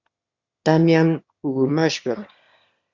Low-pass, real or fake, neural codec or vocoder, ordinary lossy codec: 7.2 kHz; fake; autoencoder, 22.05 kHz, a latent of 192 numbers a frame, VITS, trained on one speaker; Opus, 64 kbps